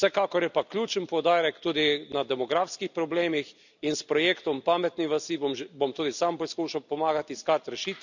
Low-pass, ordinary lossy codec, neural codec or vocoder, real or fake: 7.2 kHz; none; none; real